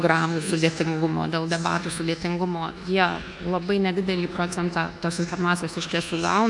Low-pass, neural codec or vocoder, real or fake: 10.8 kHz; codec, 24 kHz, 1.2 kbps, DualCodec; fake